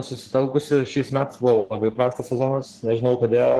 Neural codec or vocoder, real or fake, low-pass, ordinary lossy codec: codec, 44.1 kHz, 3.4 kbps, Pupu-Codec; fake; 14.4 kHz; Opus, 16 kbps